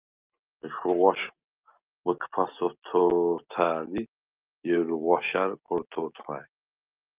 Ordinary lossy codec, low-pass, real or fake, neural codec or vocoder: Opus, 32 kbps; 3.6 kHz; real; none